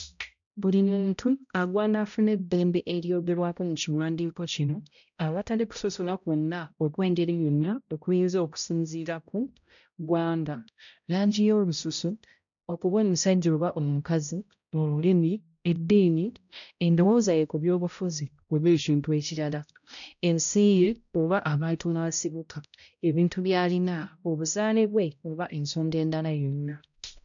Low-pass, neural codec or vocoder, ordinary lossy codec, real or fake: 7.2 kHz; codec, 16 kHz, 0.5 kbps, X-Codec, HuBERT features, trained on balanced general audio; none; fake